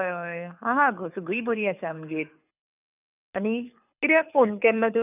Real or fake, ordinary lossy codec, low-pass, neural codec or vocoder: fake; none; 3.6 kHz; codec, 24 kHz, 6 kbps, HILCodec